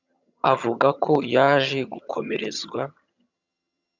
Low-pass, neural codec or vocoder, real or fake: 7.2 kHz; vocoder, 22.05 kHz, 80 mel bands, HiFi-GAN; fake